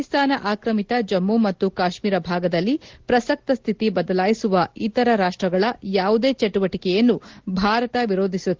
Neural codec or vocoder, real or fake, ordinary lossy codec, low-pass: none; real; Opus, 16 kbps; 7.2 kHz